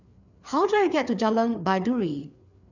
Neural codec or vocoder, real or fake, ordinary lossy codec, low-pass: codec, 16 kHz, 4 kbps, FreqCodec, larger model; fake; none; 7.2 kHz